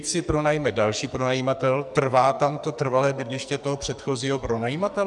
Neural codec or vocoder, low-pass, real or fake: codec, 44.1 kHz, 2.6 kbps, SNAC; 10.8 kHz; fake